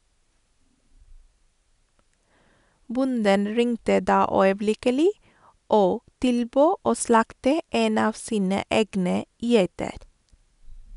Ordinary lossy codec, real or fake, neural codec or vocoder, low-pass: none; real; none; 10.8 kHz